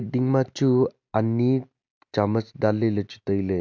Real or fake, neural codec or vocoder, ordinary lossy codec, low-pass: real; none; MP3, 64 kbps; 7.2 kHz